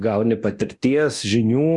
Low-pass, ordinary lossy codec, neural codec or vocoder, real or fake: 10.8 kHz; Opus, 64 kbps; codec, 24 kHz, 0.9 kbps, DualCodec; fake